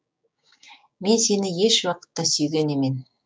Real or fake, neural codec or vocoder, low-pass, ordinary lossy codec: fake; codec, 16 kHz, 6 kbps, DAC; none; none